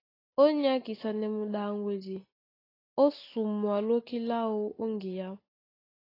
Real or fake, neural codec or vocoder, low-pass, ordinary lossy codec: real; none; 5.4 kHz; AAC, 24 kbps